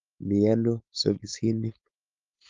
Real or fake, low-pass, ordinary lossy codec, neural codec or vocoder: fake; 7.2 kHz; Opus, 32 kbps; codec, 16 kHz, 4.8 kbps, FACodec